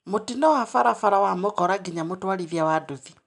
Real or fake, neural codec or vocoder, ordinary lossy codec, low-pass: real; none; none; 10.8 kHz